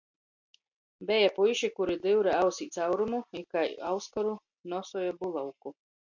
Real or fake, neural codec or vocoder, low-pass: real; none; 7.2 kHz